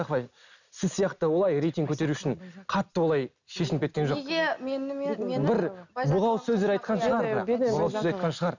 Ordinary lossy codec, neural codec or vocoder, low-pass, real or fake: none; none; 7.2 kHz; real